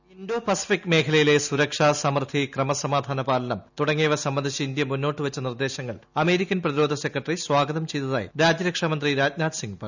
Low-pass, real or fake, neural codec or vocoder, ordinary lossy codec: 7.2 kHz; real; none; none